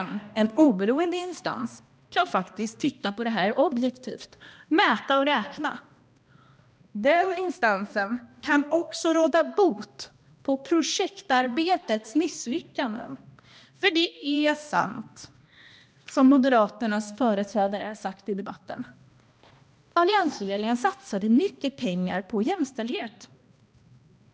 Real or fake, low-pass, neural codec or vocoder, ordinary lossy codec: fake; none; codec, 16 kHz, 1 kbps, X-Codec, HuBERT features, trained on balanced general audio; none